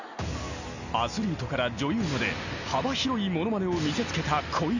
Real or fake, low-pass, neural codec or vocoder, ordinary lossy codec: real; 7.2 kHz; none; none